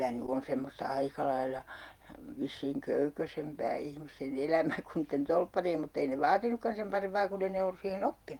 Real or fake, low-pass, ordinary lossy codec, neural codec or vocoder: fake; 19.8 kHz; Opus, 32 kbps; vocoder, 48 kHz, 128 mel bands, Vocos